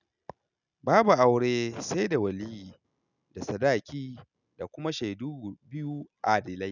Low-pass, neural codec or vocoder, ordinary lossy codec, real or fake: 7.2 kHz; none; none; real